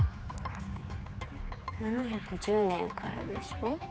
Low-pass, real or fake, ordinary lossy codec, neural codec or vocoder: none; fake; none; codec, 16 kHz, 4 kbps, X-Codec, HuBERT features, trained on general audio